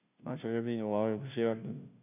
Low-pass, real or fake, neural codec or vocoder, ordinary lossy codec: 3.6 kHz; fake; codec, 16 kHz, 0.5 kbps, FunCodec, trained on Chinese and English, 25 frames a second; none